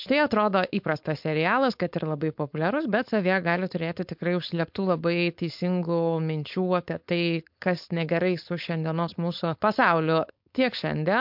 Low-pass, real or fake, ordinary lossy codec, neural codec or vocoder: 5.4 kHz; fake; MP3, 48 kbps; codec, 16 kHz, 4.8 kbps, FACodec